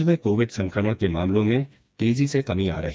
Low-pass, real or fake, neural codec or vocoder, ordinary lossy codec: none; fake; codec, 16 kHz, 2 kbps, FreqCodec, smaller model; none